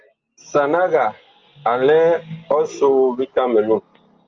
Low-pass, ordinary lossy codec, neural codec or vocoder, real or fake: 7.2 kHz; Opus, 24 kbps; none; real